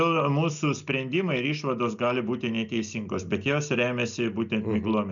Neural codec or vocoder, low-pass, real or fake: none; 7.2 kHz; real